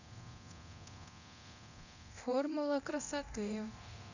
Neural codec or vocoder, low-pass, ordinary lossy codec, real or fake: codec, 24 kHz, 0.9 kbps, DualCodec; 7.2 kHz; none; fake